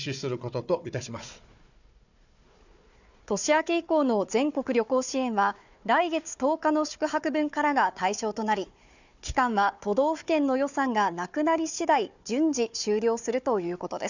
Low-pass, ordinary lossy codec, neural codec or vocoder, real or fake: 7.2 kHz; none; codec, 16 kHz, 4 kbps, FunCodec, trained on Chinese and English, 50 frames a second; fake